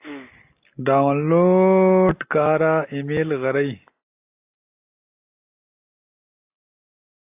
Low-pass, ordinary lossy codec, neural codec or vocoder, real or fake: 3.6 kHz; AAC, 32 kbps; none; real